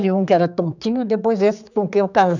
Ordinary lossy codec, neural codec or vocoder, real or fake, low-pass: none; codec, 16 kHz, 4 kbps, X-Codec, HuBERT features, trained on general audio; fake; 7.2 kHz